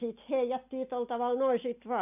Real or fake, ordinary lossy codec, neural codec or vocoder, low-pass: real; none; none; 3.6 kHz